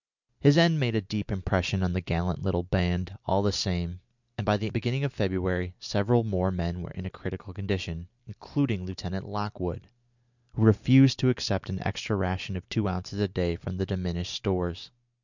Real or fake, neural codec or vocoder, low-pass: real; none; 7.2 kHz